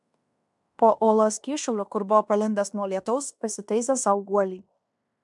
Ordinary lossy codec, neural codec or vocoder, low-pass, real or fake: MP3, 96 kbps; codec, 16 kHz in and 24 kHz out, 0.9 kbps, LongCat-Audio-Codec, fine tuned four codebook decoder; 10.8 kHz; fake